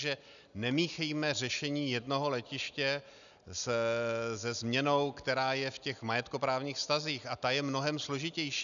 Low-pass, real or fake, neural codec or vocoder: 7.2 kHz; real; none